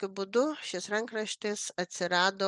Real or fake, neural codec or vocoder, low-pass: real; none; 10.8 kHz